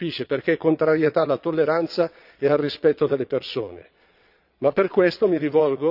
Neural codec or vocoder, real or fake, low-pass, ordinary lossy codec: vocoder, 22.05 kHz, 80 mel bands, Vocos; fake; 5.4 kHz; none